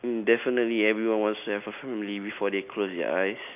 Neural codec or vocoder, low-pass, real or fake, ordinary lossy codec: none; 3.6 kHz; real; none